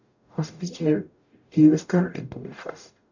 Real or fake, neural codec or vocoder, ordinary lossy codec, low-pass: fake; codec, 44.1 kHz, 0.9 kbps, DAC; none; 7.2 kHz